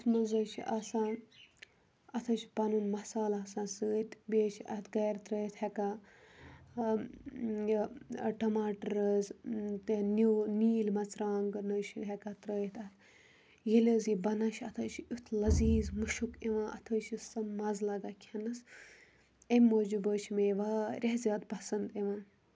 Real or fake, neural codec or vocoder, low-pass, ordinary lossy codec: real; none; none; none